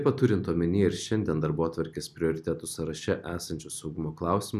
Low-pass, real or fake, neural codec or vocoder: 14.4 kHz; real; none